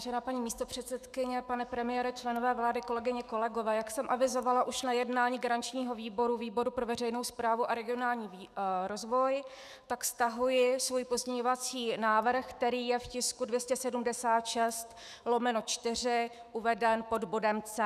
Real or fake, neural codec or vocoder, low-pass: fake; autoencoder, 48 kHz, 128 numbers a frame, DAC-VAE, trained on Japanese speech; 14.4 kHz